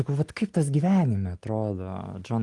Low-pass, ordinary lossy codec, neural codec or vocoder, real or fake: 10.8 kHz; Opus, 24 kbps; none; real